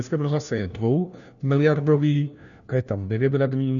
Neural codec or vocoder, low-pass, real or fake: codec, 16 kHz, 1 kbps, FunCodec, trained on LibriTTS, 50 frames a second; 7.2 kHz; fake